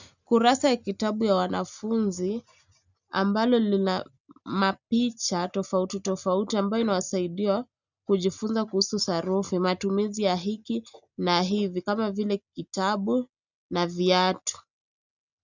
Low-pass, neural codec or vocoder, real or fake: 7.2 kHz; none; real